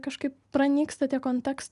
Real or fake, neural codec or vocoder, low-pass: real; none; 10.8 kHz